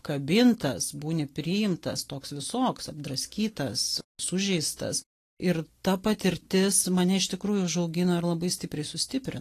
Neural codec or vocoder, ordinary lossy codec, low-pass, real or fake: none; AAC, 48 kbps; 14.4 kHz; real